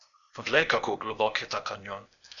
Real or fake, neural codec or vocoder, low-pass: fake; codec, 16 kHz, 0.8 kbps, ZipCodec; 7.2 kHz